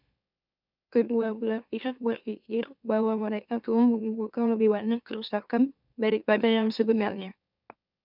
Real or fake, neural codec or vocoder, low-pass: fake; autoencoder, 44.1 kHz, a latent of 192 numbers a frame, MeloTTS; 5.4 kHz